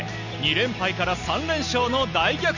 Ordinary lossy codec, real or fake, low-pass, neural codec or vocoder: none; real; 7.2 kHz; none